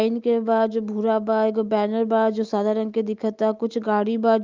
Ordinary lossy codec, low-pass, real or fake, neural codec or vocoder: Opus, 24 kbps; 7.2 kHz; real; none